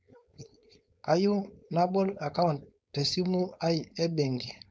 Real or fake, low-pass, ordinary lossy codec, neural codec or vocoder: fake; none; none; codec, 16 kHz, 4.8 kbps, FACodec